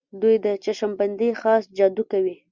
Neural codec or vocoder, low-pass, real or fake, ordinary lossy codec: none; 7.2 kHz; real; Opus, 64 kbps